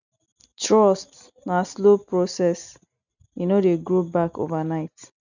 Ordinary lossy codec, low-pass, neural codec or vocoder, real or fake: none; 7.2 kHz; none; real